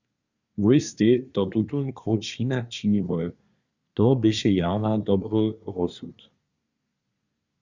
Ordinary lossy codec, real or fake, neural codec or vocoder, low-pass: Opus, 64 kbps; fake; codec, 24 kHz, 1 kbps, SNAC; 7.2 kHz